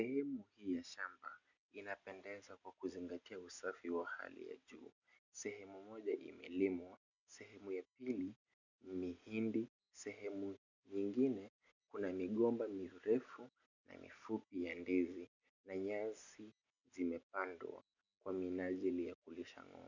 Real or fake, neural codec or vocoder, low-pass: real; none; 7.2 kHz